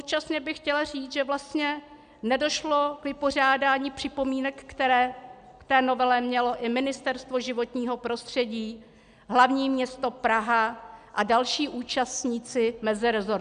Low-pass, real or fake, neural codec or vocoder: 9.9 kHz; real; none